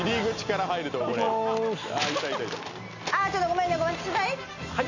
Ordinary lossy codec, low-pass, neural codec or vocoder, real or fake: none; 7.2 kHz; none; real